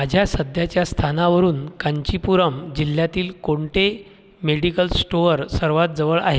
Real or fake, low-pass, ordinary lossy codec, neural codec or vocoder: real; none; none; none